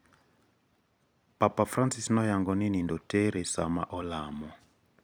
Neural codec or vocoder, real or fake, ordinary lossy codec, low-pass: vocoder, 44.1 kHz, 128 mel bands every 256 samples, BigVGAN v2; fake; none; none